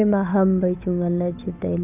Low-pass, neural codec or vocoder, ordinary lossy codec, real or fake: 3.6 kHz; codec, 16 kHz, 8 kbps, FunCodec, trained on Chinese and English, 25 frames a second; none; fake